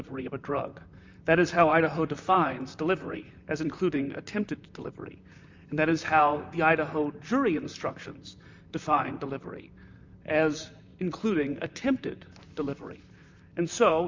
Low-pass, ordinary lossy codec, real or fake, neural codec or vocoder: 7.2 kHz; MP3, 64 kbps; fake; vocoder, 44.1 kHz, 128 mel bands, Pupu-Vocoder